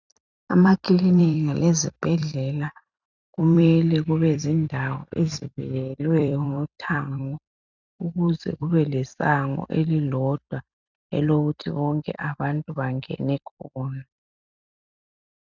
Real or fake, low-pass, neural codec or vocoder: fake; 7.2 kHz; vocoder, 44.1 kHz, 128 mel bands every 256 samples, BigVGAN v2